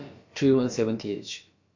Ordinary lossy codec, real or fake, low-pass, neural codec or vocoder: MP3, 48 kbps; fake; 7.2 kHz; codec, 16 kHz, about 1 kbps, DyCAST, with the encoder's durations